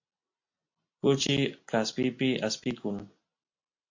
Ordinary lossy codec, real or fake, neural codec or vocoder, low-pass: MP3, 48 kbps; real; none; 7.2 kHz